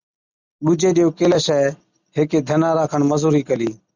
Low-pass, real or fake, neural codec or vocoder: 7.2 kHz; real; none